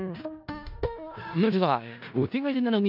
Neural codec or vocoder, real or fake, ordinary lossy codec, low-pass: codec, 16 kHz in and 24 kHz out, 0.4 kbps, LongCat-Audio-Codec, four codebook decoder; fake; none; 5.4 kHz